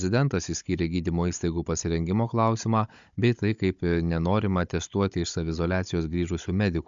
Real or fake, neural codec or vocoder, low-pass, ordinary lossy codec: fake; codec, 16 kHz, 16 kbps, FunCodec, trained on Chinese and English, 50 frames a second; 7.2 kHz; MP3, 64 kbps